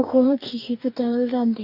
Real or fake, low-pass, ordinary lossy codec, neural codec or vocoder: fake; 5.4 kHz; none; codec, 44.1 kHz, 2.6 kbps, DAC